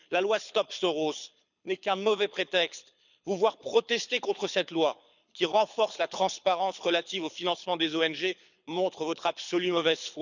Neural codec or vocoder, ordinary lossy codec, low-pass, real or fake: codec, 24 kHz, 6 kbps, HILCodec; none; 7.2 kHz; fake